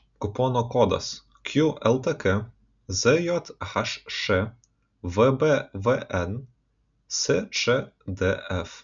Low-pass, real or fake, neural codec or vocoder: 7.2 kHz; real; none